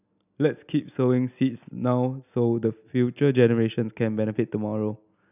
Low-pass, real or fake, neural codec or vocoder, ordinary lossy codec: 3.6 kHz; real; none; none